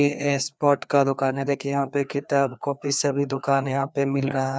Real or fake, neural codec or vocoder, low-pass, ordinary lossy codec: fake; codec, 16 kHz, 2 kbps, FreqCodec, larger model; none; none